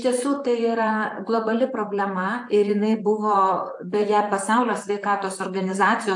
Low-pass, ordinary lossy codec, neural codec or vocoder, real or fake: 10.8 kHz; AAC, 64 kbps; vocoder, 44.1 kHz, 128 mel bands, Pupu-Vocoder; fake